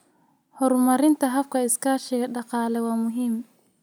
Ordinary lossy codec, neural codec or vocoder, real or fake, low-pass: none; none; real; none